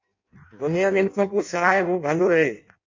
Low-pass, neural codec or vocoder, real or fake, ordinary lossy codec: 7.2 kHz; codec, 16 kHz in and 24 kHz out, 0.6 kbps, FireRedTTS-2 codec; fake; MP3, 48 kbps